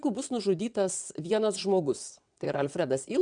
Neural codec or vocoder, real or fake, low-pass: vocoder, 22.05 kHz, 80 mel bands, WaveNeXt; fake; 9.9 kHz